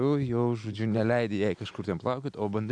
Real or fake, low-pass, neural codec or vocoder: real; 9.9 kHz; none